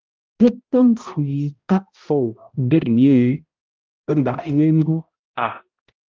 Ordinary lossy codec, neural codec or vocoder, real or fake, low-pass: Opus, 32 kbps; codec, 16 kHz, 0.5 kbps, X-Codec, HuBERT features, trained on balanced general audio; fake; 7.2 kHz